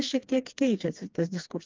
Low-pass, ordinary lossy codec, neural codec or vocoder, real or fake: 7.2 kHz; Opus, 24 kbps; codec, 16 kHz, 2 kbps, FreqCodec, smaller model; fake